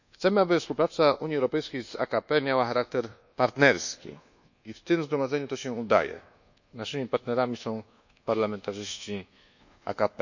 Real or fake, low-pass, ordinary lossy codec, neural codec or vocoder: fake; 7.2 kHz; none; codec, 24 kHz, 1.2 kbps, DualCodec